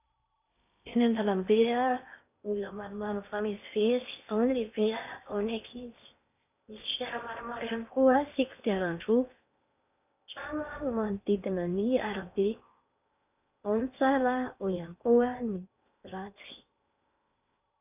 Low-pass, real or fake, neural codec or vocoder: 3.6 kHz; fake; codec, 16 kHz in and 24 kHz out, 0.8 kbps, FocalCodec, streaming, 65536 codes